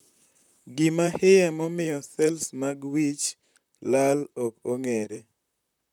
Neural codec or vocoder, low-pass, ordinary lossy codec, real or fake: vocoder, 44.1 kHz, 128 mel bands, Pupu-Vocoder; 19.8 kHz; none; fake